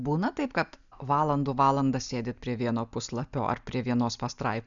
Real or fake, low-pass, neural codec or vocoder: real; 7.2 kHz; none